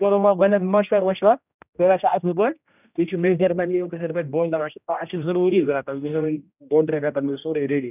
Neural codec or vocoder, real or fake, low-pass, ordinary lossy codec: codec, 16 kHz, 1 kbps, X-Codec, HuBERT features, trained on general audio; fake; 3.6 kHz; none